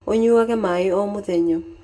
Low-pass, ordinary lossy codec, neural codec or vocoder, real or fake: none; none; none; real